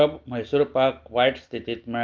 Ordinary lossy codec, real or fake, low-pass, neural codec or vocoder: Opus, 24 kbps; real; 7.2 kHz; none